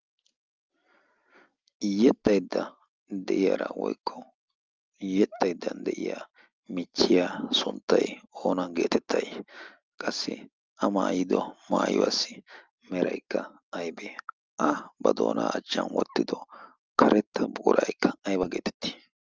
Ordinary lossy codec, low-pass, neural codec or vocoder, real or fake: Opus, 24 kbps; 7.2 kHz; none; real